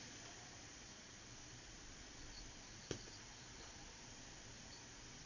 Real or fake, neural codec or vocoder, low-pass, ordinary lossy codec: fake; vocoder, 22.05 kHz, 80 mel bands, Vocos; 7.2 kHz; none